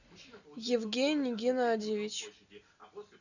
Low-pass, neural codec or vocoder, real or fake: 7.2 kHz; none; real